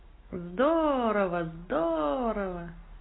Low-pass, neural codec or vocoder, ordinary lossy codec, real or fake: 7.2 kHz; none; AAC, 16 kbps; real